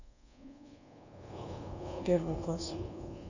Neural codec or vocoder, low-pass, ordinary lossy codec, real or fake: codec, 24 kHz, 1.2 kbps, DualCodec; 7.2 kHz; none; fake